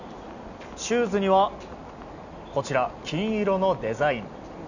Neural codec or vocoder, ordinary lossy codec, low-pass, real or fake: none; none; 7.2 kHz; real